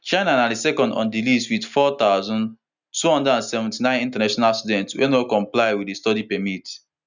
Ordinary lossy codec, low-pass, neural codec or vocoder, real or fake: none; 7.2 kHz; none; real